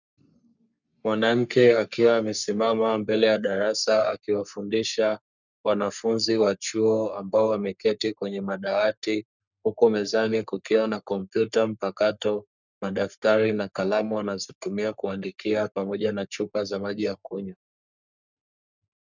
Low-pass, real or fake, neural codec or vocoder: 7.2 kHz; fake; codec, 44.1 kHz, 3.4 kbps, Pupu-Codec